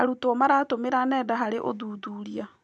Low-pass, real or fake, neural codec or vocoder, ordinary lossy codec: none; real; none; none